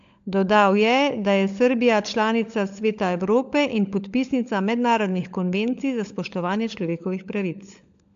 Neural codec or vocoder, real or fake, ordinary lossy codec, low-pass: codec, 16 kHz, 16 kbps, FunCodec, trained on LibriTTS, 50 frames a second; fake; AAC, 64 kbps; 7.2 kHz